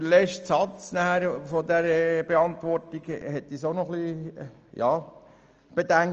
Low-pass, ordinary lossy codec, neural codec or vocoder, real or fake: 7.2 kHz; Opus, 24 kbps; none; real